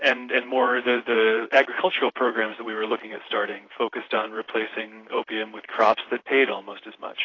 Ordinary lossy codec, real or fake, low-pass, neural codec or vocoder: AAC, 32 kbps; fake; 7.2 kHz; vocoder, 44.1 kHz, 128 mel bands, Pupu-Vocoder